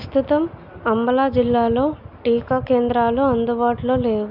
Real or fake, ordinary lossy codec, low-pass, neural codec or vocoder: real; none; 5.4 kHz; none